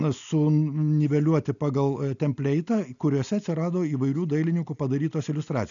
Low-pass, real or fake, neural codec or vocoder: 7.2 kHz; real; none